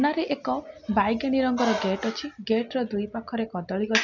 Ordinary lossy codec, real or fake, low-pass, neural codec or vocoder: none; real; 7.2 kHz; none